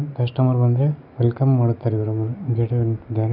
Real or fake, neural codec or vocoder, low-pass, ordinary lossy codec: real; none; 5.4 kHz; none